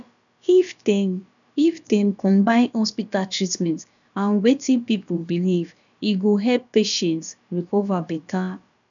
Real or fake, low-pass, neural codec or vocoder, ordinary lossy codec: fake; 7.2 kHz; codec, 16 kHz, about 1 kbps, DyCAST, with the encoder's durations; none